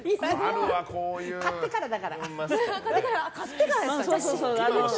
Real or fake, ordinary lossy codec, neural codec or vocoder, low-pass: real; none; none; none